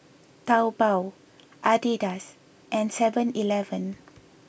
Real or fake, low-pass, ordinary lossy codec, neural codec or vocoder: real; none; none; none